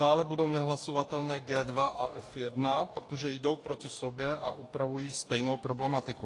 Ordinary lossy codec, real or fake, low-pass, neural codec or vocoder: AAC, 48 kbps; fake; 10.8 kHz; codec, 44.1 kHz, 2.6 kbps, DAC